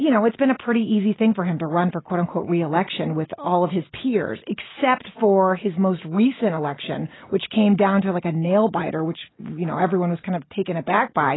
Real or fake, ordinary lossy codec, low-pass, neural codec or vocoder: real; AAC, 16 kbps; 7.2 kHz; none